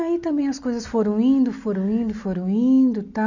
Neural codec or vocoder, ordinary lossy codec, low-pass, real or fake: none; none; 7.2 kHz; real